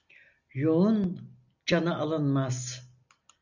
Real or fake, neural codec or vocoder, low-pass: real; none; 7.2 kHz